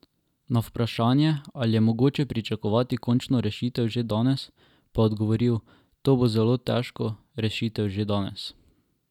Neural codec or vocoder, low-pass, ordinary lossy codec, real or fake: none; 19.8 kHz; none; real